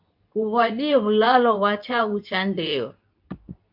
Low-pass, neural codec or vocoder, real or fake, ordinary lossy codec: 5.4 kHz; codec, 24 kHz, 0.9 kbps, WavTokenizer, medium speech release version 2; fake; MP3, 48 kbps